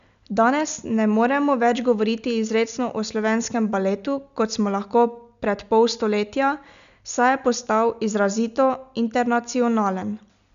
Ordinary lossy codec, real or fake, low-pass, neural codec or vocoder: none; real; 7.2 kHz; none